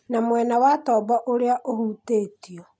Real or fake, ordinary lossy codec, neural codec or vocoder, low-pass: real; none; none; none